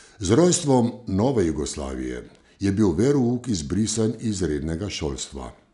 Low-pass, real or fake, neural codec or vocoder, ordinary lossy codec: 10.8 kHz; real; none; none